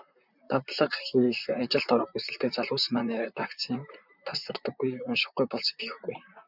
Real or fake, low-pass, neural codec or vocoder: fake; 5.4 kHz; vocoder, 44.1 kHz, 128 mel bands, Pupu-Vocoder